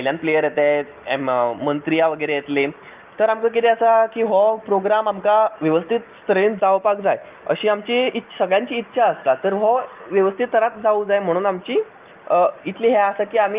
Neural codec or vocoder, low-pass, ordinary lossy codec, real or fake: none; 3.6 kHz; Opus, 32 kbps; real